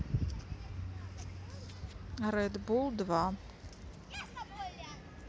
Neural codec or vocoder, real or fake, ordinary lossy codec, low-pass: none; real; none; none